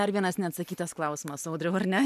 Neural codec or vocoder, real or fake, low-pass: none; real; 14.4 kHz